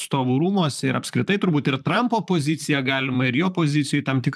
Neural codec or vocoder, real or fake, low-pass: vocoder, 44.1 kHz, 128 mel bands, Pupu-Vocoder; fake; 14.4 kHz